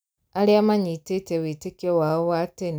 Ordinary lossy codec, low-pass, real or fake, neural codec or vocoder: none; none; real; none